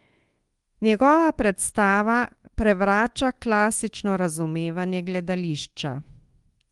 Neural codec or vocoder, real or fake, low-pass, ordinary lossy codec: codec, 24 kHz, 1.2 kbps, DualCodec; fake; 10.8 kHz; Opus, 24 kbps